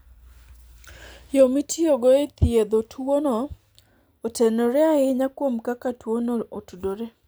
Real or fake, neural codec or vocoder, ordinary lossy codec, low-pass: real; none; none; none